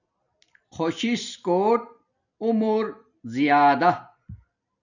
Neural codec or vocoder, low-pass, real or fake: vocoder, 44.1 kHz, 128 mel bands every 256 samples, BigVGAN v2; 7.2 kHz; fake